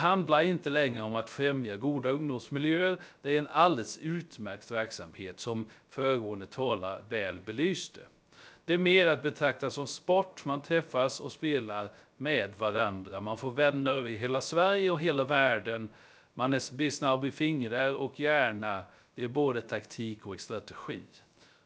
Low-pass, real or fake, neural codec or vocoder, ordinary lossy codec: none; fake; codec, 16 kHz, 0.3 kbps, FocalCodec; none